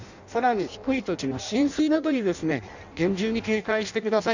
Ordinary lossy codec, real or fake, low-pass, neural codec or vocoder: none; fake; 7.2 kHz; codec, 16 kHz in and 24 kHz out, 0.6 kbps, FireRedTTS-2 codec